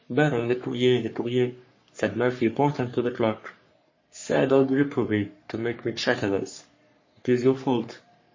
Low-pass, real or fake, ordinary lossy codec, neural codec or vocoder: 7.2 kHz; fake; MP3, 32 kbps; codec, 44.1 kHz, 3.4 kbps, Pupu-Codec